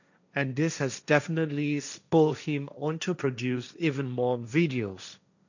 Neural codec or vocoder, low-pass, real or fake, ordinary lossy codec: codec, 16 kHz, 1.1 kbps, Voila-Tokenizer; 7.2 kHz; fake; none